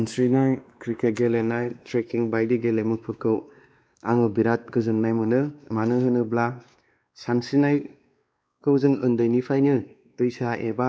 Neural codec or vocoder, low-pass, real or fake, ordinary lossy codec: codec, 16 kHz, 2 kbps, X-Codec, WavLM features, trained on Multilingual LibriSpeech; none; fake; none